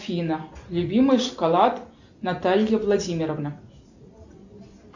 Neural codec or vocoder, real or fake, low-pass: none; real; 7.2 kHz